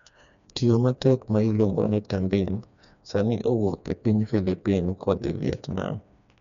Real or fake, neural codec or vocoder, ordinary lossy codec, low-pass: fake; codec, 16 kHz, 2 kbps, FreqCodec, smaller model; none; 7.2 kHz